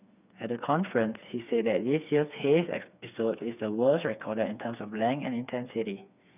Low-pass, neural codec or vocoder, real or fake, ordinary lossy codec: 3.6 kHz; codec, 16 kHz, 4 kbps, FreqCodec, smaller model; fake; none